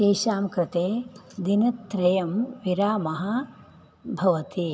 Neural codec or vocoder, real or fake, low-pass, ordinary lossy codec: none; real; none; none